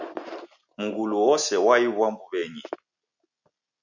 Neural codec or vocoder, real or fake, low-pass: none; real; 7.2 kHz